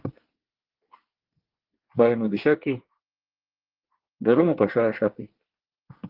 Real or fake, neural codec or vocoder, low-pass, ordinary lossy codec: fake; codec, 24 kHz, 1 kbps, SNAC; 5.4 kHz; Opus, 16 kbps